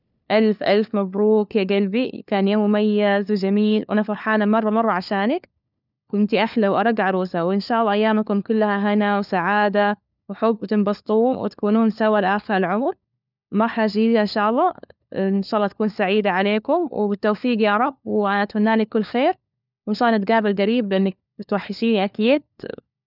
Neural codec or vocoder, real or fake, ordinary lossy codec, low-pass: codec, 16 kHz, 4 kbps, FunCodec, trained on LibriTTS, 50 frames a second; fake; none; 5.4 kHz